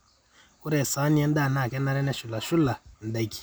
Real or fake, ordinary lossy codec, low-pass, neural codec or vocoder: real; none; none; none